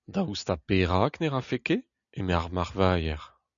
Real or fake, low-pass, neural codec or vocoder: real; 7.2 kHz; none